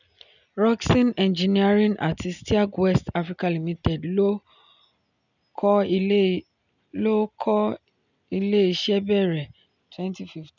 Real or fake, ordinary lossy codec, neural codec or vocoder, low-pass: real; none; none; 7.2 kHz